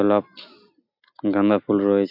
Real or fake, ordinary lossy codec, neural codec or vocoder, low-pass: real; AAC, 48 kbps; none; 5.4 kHz